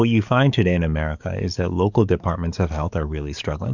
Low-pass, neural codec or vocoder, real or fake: 7.2 kHz; codec, 44.1 kHz, 7.8 kbps, DAC; fake